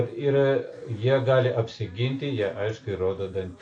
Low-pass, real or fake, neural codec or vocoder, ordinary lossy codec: 9.9 kHz; real; none; AAC, 48 kbps